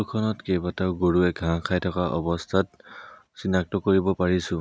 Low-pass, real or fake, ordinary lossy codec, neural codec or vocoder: none; real; none; none